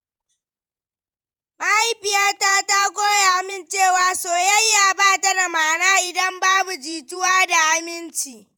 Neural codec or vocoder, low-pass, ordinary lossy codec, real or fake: vocoder, 48 kHz, 128 mel bands, Vocos; none; none; fake